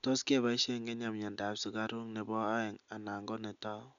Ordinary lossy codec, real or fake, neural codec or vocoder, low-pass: none; real; none; 7.2 kHz